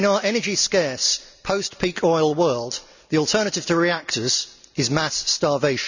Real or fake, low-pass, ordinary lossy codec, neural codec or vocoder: real; 7.2 kHz; none; none